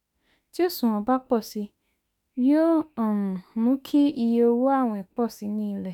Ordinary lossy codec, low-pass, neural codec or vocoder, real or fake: none; 19.8 kHz; autoencoder, 48 kHz, 32 numbers a frame, DAC-VAE, trained on Japanese speech; fake